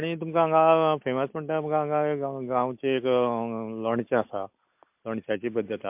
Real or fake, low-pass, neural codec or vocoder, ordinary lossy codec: real; 3.6 kHz; none; MP3, 32 kbps